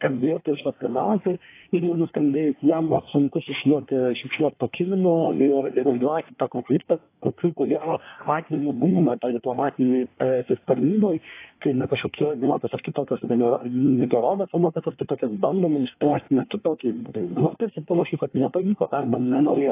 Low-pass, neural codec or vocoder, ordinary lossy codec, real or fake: 3.6 kHz; codec, 24 kHz, 1 kbps, SNAC; AAC, 24 kbps; fake